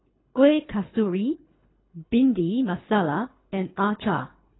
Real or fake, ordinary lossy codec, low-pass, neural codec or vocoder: fake; AAC, 16 kbps; 7.2 kHz; codec, 24 kHz, 3 kbps, HILCodec